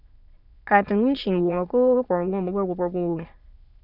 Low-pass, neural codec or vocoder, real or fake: 5.4 kHz; autoencoder, 22.05 kHz, a latent of 192 numbers a frame, VITS, trained on many speakers; fake